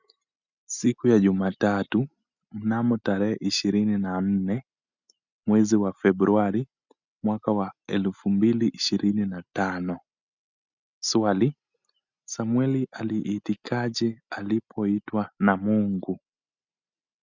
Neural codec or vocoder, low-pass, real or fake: none; 7.2 kHz; real